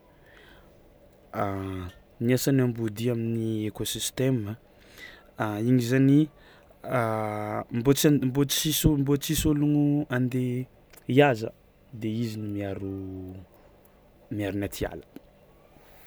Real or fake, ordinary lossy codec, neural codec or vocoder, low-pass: real; none; none; none